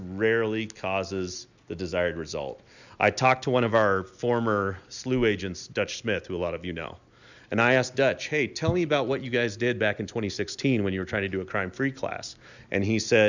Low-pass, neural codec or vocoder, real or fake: 7.2 kHz; none; real